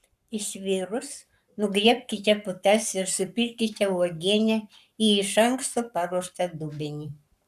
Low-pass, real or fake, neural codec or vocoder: 14.4 kHz; fake; codec, 44.1 kHz, 7.8 kbps, Pupu-Codec